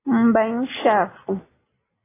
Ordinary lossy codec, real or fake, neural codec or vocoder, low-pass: AAC, 16 kbps; real; none; 3.6 kHz